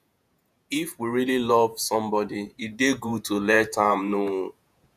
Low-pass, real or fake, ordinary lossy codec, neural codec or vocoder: 14.4 kHz; fake; none; vocoder, 48 kHz, 128 mel bands, Vocos